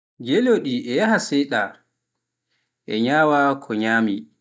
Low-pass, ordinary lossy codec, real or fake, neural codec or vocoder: none; none; real; none